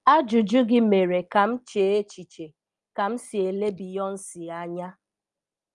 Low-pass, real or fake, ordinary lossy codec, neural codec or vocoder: 10.8 kHz; real; Opus, 32 kbps; none